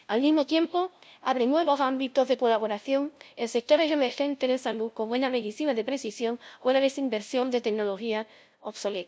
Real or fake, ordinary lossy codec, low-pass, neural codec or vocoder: fake; none; none; codec, 16 kHz, 0.5 kbps, FunCodec, trained on LibriTTS, 25 frames a second